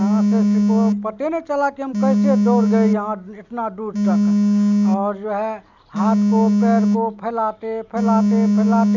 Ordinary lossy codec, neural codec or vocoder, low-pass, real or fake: none; none; 7.2 kHz; real